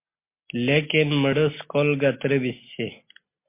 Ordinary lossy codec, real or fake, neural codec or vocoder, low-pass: MP3, 24 kbps; real; none; 3.6 kHz